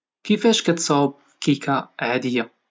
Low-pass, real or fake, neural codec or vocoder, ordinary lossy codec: none; real; none; none